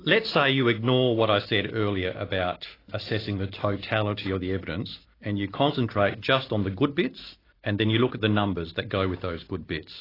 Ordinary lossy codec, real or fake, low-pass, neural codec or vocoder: AAC, 24 kbps; real; 5.4 kHz; none